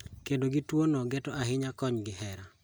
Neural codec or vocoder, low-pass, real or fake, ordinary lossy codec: none; none; real; none